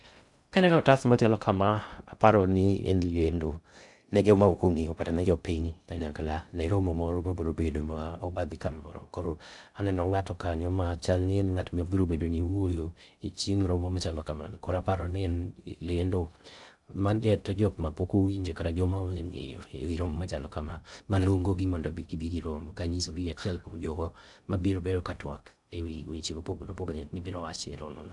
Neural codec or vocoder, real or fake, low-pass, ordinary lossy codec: codec, 16 kHz in and 24 kHz out, 0.6 kbps, FocalCodec, streaming, 2048 codes; fake; 10.8 kHz; none